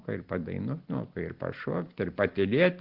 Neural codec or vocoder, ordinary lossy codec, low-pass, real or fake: none; Opus, 16 kbps; 5.4 kHz; real